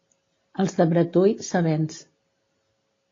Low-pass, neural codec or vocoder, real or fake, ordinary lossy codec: 7.2 kHz; none; real; AAC, 32 kbps